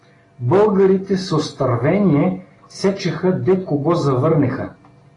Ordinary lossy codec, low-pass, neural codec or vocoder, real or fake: AAC, 32 kbps; 10.8 kHz; none; real